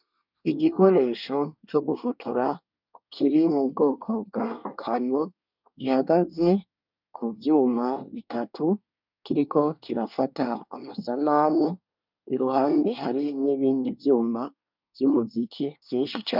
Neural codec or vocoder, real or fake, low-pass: codec, 24 kHz, 1 kbps, SNAC; fake; 5.4 kHz